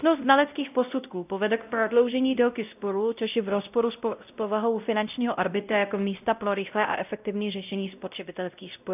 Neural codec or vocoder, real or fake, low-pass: codec, 16 kHz, 0.5 kbps, X-Codec, WavLM features, trained on Multilingual LibriSpeech; fake; 3.6 kHz